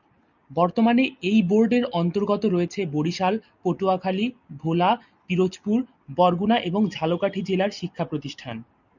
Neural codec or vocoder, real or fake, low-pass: none; real; 7.2 kHz